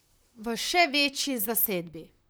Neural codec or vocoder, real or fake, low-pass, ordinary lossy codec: vocoder, 44.1 kHz, 128 mel bands, Pupu-Vocoder; fake; none; none